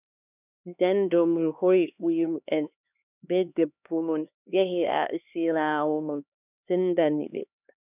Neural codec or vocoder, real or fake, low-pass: codec, 16 kHz, 2 kbps, X-Codec, HuBERT features, trained on LibriSpeech; fake; 3.6 kHz